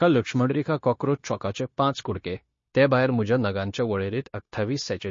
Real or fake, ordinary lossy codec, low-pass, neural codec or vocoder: fake; MP3, 32 kbps; 7.2 kHz; codec, 16 kHz, 0.9 kbps, LongCat-Audio-Codec